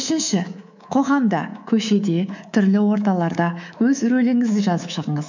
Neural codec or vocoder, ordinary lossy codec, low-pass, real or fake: codec, 24 kHz, 3.1 kbps, DualCodec; none; 7.2 kHz; fake